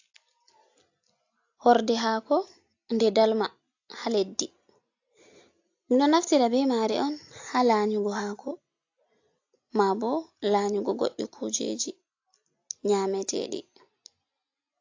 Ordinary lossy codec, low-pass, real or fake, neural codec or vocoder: AAC, 48 kbps; 7.2 kHz; real; none